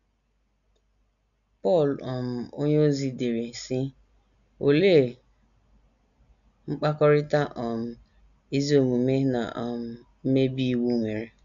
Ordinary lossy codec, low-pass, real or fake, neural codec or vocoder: none; 7.2 kHz; real; none